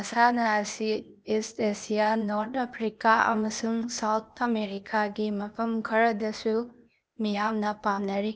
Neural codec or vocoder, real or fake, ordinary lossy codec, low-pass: codec, 16 kHz, 0.8 kbps, ZipCodec; fake; none; none